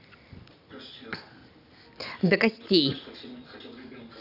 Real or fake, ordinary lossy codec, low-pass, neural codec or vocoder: real; none; 5.4 kHz; none